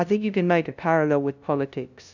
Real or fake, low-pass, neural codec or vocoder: fake; 7.2 kHz; codec, 16 kHz, 0.5 kbps, FunCodec, trained on LibriTTS, 25 frames a second